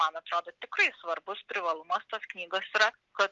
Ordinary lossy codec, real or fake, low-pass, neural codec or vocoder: Opus, 32 kbps; real; 7.2 kHz; none